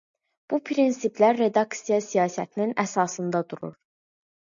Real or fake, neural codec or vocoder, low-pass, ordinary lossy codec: real; none; 7.2 kHz; MP3, 96 kbps